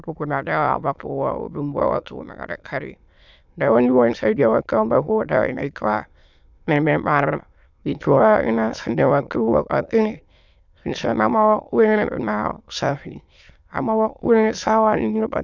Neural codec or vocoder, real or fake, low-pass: autoencoder, 22.05 kHz, a latent of 192 numbers a frame, VITS, trained on many speakers; fake; 7.2 kHz